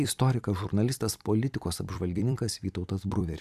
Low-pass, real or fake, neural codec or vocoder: 14.4 kHz; fake; vocoder, 44.1 kHz, 128 mel bands every 256 samples, BigVGAN v2